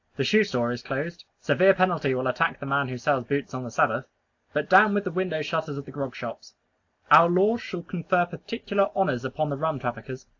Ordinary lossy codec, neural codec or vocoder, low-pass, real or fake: AAC, 48 kbps; none; 7.2 kHz; real